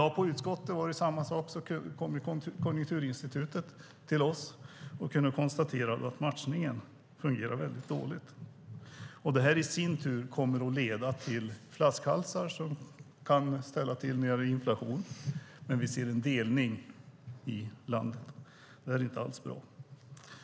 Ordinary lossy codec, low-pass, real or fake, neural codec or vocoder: none; none; real; none